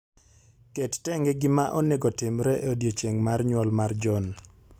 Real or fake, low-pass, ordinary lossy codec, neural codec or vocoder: real; 19.8 kHz; none; none